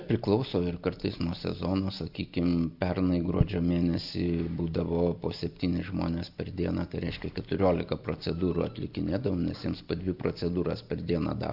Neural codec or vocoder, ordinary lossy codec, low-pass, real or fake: none; AAC, 48 kbps; 5.4 kHz; real